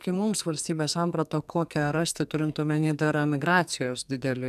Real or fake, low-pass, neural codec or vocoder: fake; 14.4 kHz; codec, 44.1 kHz, 2.6 kbps, SNAC